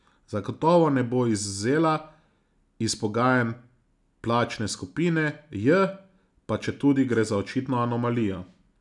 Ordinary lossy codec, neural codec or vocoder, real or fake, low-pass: none; none; real; 10.8 kHz